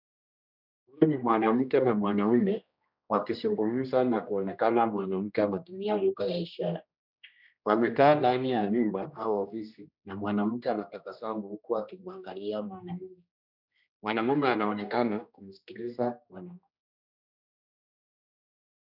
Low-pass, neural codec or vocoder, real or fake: 5.4 kHz; codec, 16 kHz, 1 kbps, X-Codec, HuBERT features, trained on general audio; fake